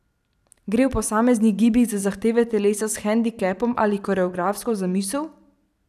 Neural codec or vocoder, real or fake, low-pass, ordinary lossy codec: vocoder, 44.1 kHz, 128 mel bands every 512 samples, BigVGAN v2; fake; 14.4 kHz; none